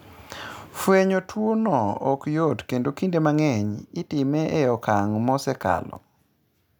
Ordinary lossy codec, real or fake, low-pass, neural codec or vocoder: none; real; none; none